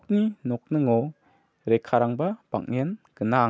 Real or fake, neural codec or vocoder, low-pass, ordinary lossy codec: real; none; none; none